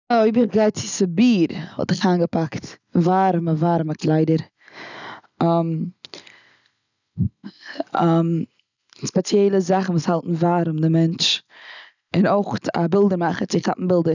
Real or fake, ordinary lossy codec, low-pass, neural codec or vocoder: real; none; 7.2 kHz; none